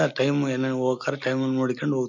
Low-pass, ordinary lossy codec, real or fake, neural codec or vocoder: 7.2 kHz; none; real; none